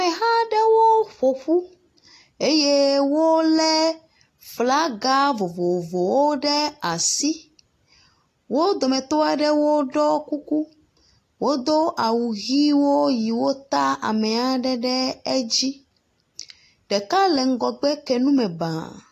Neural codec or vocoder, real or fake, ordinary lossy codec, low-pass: none; real; AAC, 48 kbps; 14.4 kHz